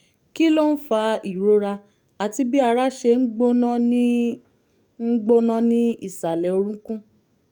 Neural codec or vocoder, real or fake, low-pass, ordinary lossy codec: codec, 44.1 kHz, 7.8 kbps, DAC; fake; 19.8 kHz; none